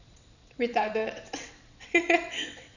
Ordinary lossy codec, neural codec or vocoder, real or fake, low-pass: none; vocoder, 22.05 kHz, 80 mel bands, WaveNeXt; fake; 7.2 kHz